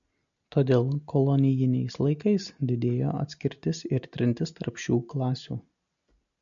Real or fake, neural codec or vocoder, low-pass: real; none; 7.2 kHz